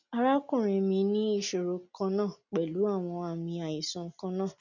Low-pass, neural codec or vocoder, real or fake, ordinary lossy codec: 7.2 kHz; none; real; none